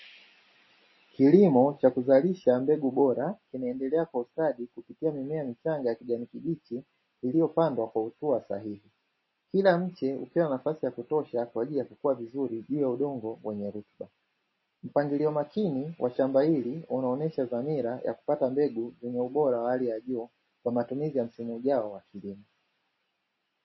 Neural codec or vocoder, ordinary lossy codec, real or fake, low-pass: none; MP3, 24 kbps; real; 7.2 kHz